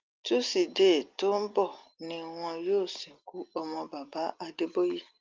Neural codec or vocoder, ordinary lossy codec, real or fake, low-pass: none; Opus, 24 kbps; real; 7.2 kHz